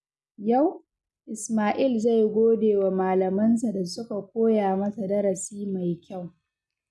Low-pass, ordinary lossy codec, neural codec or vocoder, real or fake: none; none; none; real